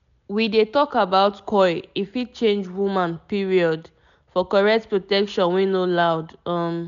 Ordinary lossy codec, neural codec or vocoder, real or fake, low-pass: none; none; real; 7.2 kHz